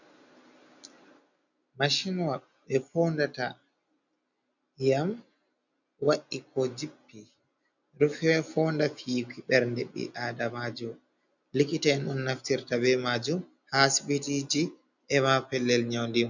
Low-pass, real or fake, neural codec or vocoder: 7.2 kHz; real; none